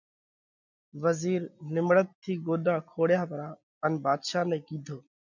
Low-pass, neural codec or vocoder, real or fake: 7.2 kHz; none; real